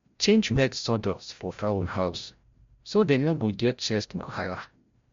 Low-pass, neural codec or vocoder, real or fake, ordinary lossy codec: 7.2 kHz; codec, 16 kHz, 0.5 kbps, FreqCodec, larger model; fake; MP3, 48 kbps